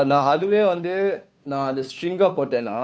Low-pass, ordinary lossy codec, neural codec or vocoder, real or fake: none; none; codec, 16 kHz, 2 kbps, FunCodec, trained on Chinese and English, 25 frames a second; fake